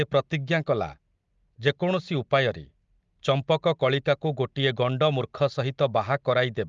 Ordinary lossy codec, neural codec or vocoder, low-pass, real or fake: Opus, 32 kbps; none; 7.2 kHz; real